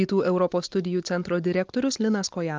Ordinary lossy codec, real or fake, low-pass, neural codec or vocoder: Opus, 24 kbps; real; 7.2 kHz; none